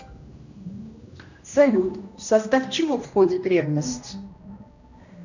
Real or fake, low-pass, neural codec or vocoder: fake; 7.2 kHz; codec, 16 kHz, 1 kbps, X-Codec, HuBERT features, trained on general audio